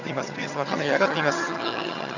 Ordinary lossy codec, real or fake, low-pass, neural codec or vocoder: none; fake; 7.2 kHz; vocoder, 22.05 kHz, 80 mel bands, HiFi-GAN